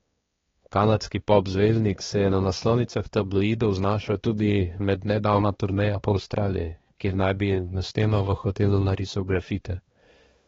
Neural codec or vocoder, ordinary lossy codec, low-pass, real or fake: codec, 16 kHz, 2 kbps, X-Codec, HuBERT features, trained on balanced general audio; AAC, 24 kbps; 7.2 kHz; fake